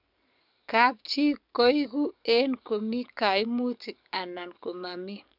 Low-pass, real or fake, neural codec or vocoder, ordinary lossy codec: 5.4 kHz; fake; codec, 44.1 kHz, 7.8 kbps, DAC; none